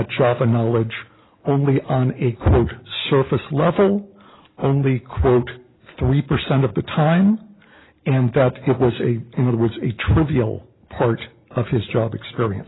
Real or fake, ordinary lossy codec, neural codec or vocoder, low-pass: real; AAC, 16 kbps; none; 7.2 kHz